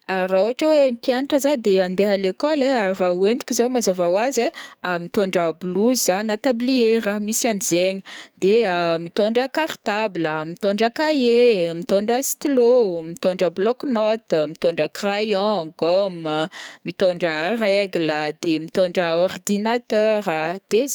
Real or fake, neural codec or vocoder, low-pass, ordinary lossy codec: fake; codec, 44.1 kHz, 2.6 kbps, SNAC; none; none